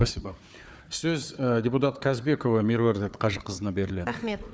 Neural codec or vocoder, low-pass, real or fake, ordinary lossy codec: codec, 16 kHz, 4 kbps, FunCodec, trained on Chinese and English, 50 frames a second; none; fake; none